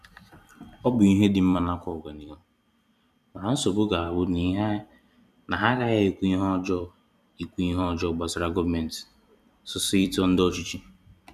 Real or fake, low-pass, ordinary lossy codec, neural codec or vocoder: fake; 14.4 kHz; none; vocoder, 44.1 kHz, 128 mel bands every 256 samples, BigVGAN v2